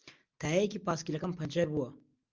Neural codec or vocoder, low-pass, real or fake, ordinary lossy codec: none; 7.2 kHz; real; Opus, 32 kbps